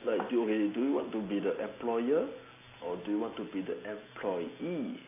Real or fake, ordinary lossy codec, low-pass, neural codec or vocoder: real; AAC, 16 kbps; 3.6 kHz; none